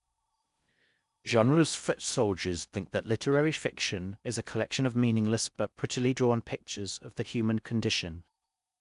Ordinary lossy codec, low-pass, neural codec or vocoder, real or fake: none; 10.8 kHz; codec, 16 kHz in and 24 kHz out, 0.6 kbps, FocalCodec, streaming, 4096 codes; fake